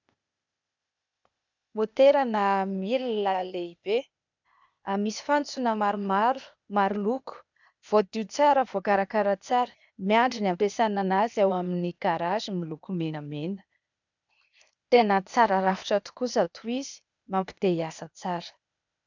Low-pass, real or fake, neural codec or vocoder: 7.2 kHz; fake; codec, 16 kHz, 0.8 kbps, ZipCodec